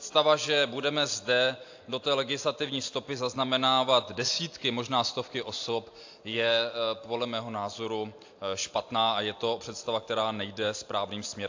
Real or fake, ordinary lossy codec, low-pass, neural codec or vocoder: real; AAC, 48 kbps; 7.2 kHz; none